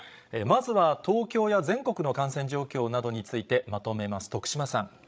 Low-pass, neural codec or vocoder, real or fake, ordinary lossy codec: none; codec, 16 kHz, 16 kbps, FreqCodec, larger model; fake; none